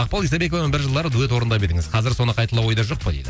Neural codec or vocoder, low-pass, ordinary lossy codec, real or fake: none; none; none; real